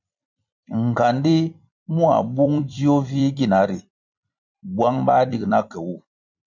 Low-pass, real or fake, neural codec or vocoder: 7.2 kHz; fake; vocoder, 44.1 kHz, 128 mel bands every 256 samples, BigVGAN v2